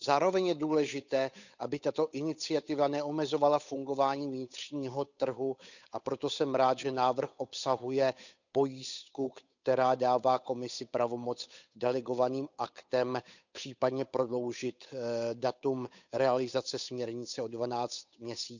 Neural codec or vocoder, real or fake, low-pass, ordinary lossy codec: codec, 16 kHz, 8 kbps, FunCodec, trained on Chinese and English, 25 frames a second; fake; 7.2 kHz; none